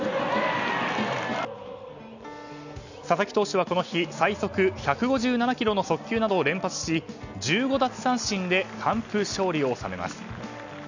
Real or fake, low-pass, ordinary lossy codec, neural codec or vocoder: real; 7.2 kHz; none; none